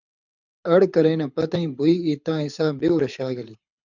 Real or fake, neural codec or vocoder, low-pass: fake; codec, 24 kHz, 6 kbps, HILCodec; 7.2 kHz